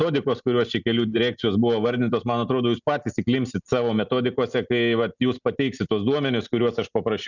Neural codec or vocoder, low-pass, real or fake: none; 7.2 kHz; real